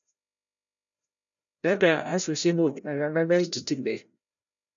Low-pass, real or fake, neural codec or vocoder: 7.2 kHz; fake; codec, 16 kHz, 0.5 kbps, FreqCodec, larger model